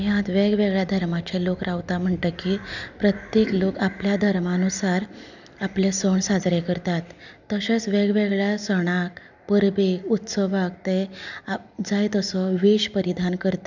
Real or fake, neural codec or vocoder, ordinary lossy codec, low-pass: real; none; none; 7.2 kHz